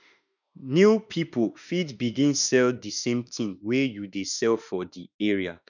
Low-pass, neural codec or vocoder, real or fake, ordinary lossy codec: 7.2 kHz; autoencoder, 48 kHz, 32 numbers a frame, DAC-VAE, trained on Japanese speech; fake; none